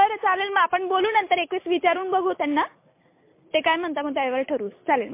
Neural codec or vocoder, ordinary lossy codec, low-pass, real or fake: none; MP3, 24 kbps; 3.6 kHz; real